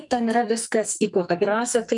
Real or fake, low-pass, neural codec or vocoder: fake; 10.8 kHz; codec, 44.1 kHz, 2.6 kbps, SNAC